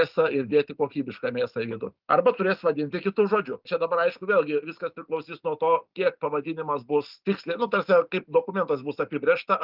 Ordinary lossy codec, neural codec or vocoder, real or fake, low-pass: Opus, 24 kbps; none; real; 5.4 kHz